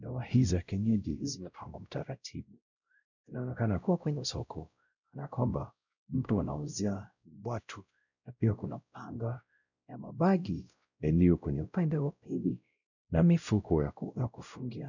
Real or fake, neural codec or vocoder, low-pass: fake; codec, 16 kHz, 0.5 kbps, X-Codec, WavLM features, trained on Multilingual LibriSpeech; 7.2 kHz